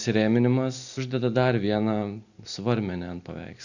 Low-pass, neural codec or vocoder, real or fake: 7.2 kHz; none; real